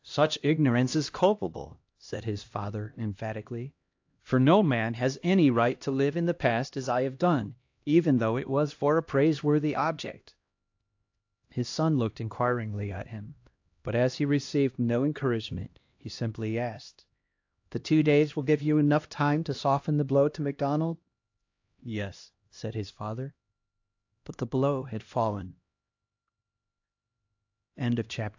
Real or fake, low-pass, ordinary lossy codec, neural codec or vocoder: fake; 7.2 kHz; AAC, 48 kbps; codec, 16 kHz, 1 kbps, X-Codec, HuBERT features, trained on LibriSpeech